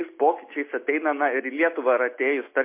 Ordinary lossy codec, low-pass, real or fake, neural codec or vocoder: MP3, 24 kbps; 3.6 kHz; real; none